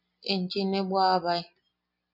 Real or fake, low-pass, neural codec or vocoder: real; 5.4 kHz; none